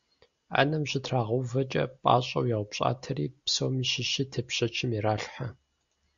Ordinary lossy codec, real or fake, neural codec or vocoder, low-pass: Opus, 64 kbps; real; none; 7.2 kHz